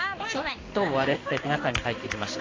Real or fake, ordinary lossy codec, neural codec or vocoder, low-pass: fake; AAC, 32 kbps; codec, 16 kHz in and 24 kHz out, 1 kbps, XY-Tokenizer; 7.2 kHz